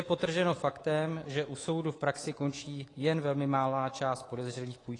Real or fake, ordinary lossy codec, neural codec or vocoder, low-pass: fake; AAC, 32 kbps; codec, 24 kHz, 3.1 kbps, DualCodec; 10.8 kHz